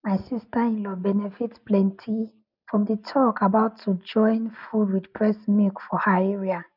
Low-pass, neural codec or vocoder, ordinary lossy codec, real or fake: 5.4 kHz; none; none; real